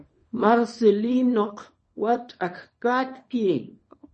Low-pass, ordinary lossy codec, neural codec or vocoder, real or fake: 10.8 kHz; MP3, 32 kbps; codec, 24 kHz, 0.9 kbps, WavTokenizer, small release; fake